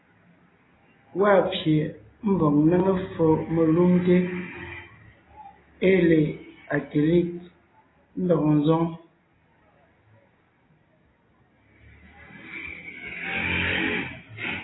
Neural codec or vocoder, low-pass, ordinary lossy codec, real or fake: none; 7.2 kHz; AAC, 16 kbps; real